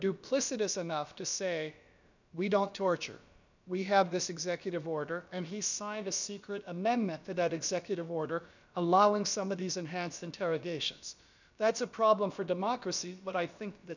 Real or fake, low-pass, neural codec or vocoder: fake; 7.2 kHz; codec, 16 kHz, about 1 kbps, DyCAST, with the encoder's durations